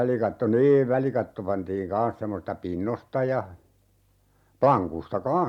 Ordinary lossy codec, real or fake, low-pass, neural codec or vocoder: MP3, 96 kbps; real; 19.8 kHz; none